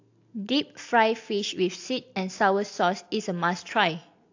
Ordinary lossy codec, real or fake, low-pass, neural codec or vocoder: AAC, 48 kbps; real; 7.2 kHz; none